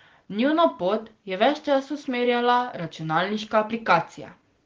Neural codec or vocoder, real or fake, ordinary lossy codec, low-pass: none; real; Opus, 16 kbps; 7.2 kHz